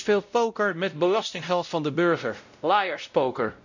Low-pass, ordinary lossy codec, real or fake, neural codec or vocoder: 7.2 kHz; none; fake; codec, 16 kHz, 0.5 kbps, X-Codec, WavLM features, trained on Multilingual LibriSpeech